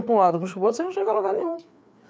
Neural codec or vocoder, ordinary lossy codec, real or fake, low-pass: codec, 16 kHz, 4 kbps, FreqCodec, larger model; none; fake; none